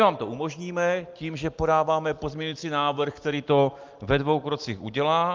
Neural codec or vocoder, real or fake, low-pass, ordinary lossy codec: none; real; 7.2 kHz; Opus, 32 kbps